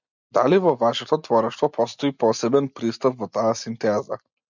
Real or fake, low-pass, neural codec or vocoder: real; 7.2 kHz; none